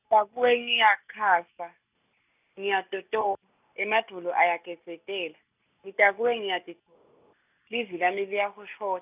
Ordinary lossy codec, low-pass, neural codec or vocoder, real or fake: none; 3.6 kHz; none; real